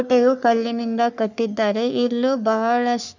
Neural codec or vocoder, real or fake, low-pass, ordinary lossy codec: codec, 44.1 kHz, 3.4 kbps, Pupu-Codec; fake; 7.2 kHz; none